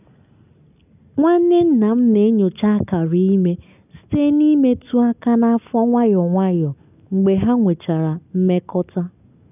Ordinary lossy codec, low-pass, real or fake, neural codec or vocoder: none; 3.6 kHz; real; none